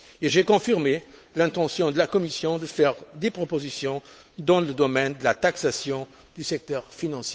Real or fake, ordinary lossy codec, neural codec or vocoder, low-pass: fake; none; codec, 16 kHz, 8 kbps, FunCodec, trained on Chinese and English, 25 frames a second; none